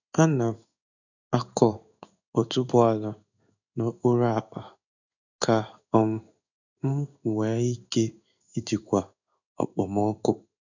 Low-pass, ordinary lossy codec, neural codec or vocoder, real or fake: 7.2 kHz; none; codec, 16 kHz in and 24 kHz out, 1 kbps, XY-Tokenizer; fake